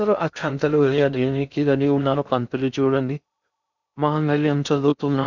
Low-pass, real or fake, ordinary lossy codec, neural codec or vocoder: 7.2 kHz; fake; none; codec, 16 kHz in and 24 kHz out, 0.6 kbps, FocalCodec, streaming, 2048 codes